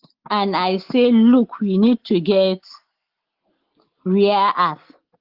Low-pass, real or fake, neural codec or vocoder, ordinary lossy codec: 5.4 kHz; real; none; Opus, 16 kbps